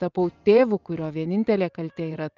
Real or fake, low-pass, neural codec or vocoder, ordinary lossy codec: fake; 7.2 kHz; vocoder, 24 kHz, 100 mel bands, Vocos; Opus, 24 kbps